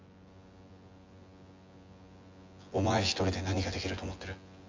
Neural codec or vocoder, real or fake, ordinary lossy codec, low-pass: vocoder, 24 kHz, 100 mel bands, Vocos; fake; Opus, 32 kbps; 7.2 kHz